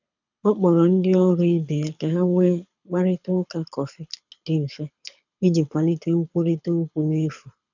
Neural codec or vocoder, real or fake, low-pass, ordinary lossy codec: codec, 24 kHz, 6 kbps, HILCodec; fake; 7.2 kHz; none